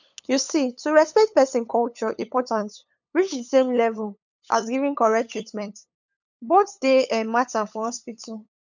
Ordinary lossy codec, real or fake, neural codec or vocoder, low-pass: none; fake; codec, 16 kHz, 8 kbps, FunCodec, trained on LibriTTS, 25 frames a second; 7.2 kHz